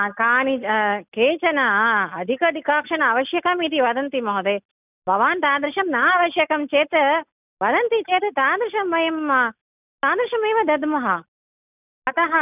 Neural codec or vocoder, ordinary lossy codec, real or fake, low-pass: none; none; real; 3.6 kHz